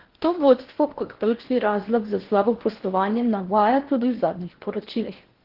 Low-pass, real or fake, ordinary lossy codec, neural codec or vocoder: 5.4 kHz; fake; Opus, 16 kbps; codec, 16 kHz in and 24 kHz out, 0.8 kbps, FocalCodec, streaming, 65536 codes